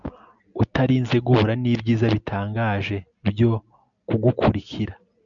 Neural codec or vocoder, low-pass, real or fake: none; 7.2 kHz; real